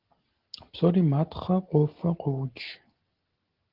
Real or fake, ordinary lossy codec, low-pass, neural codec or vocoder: real; Opus, 16 kbps; 5.4 kHz; none